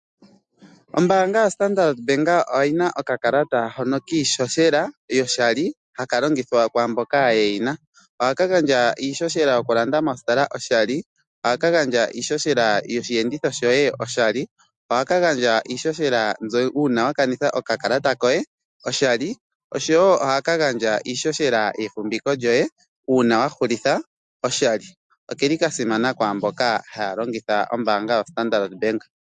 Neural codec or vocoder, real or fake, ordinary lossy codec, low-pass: none; real; MP3, 64 kbps; 10.8 kHz